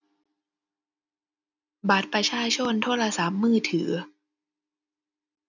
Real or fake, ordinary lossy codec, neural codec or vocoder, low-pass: real; none; none; 7.2 kHz